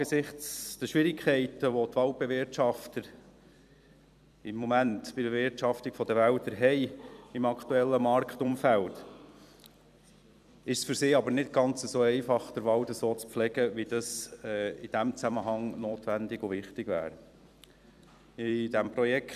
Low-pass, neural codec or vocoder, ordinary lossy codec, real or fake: 14.4 kHz; vocoder, 44.1 kHz, 128 mel bands every 256 samples, BigVGAN v2; none; fake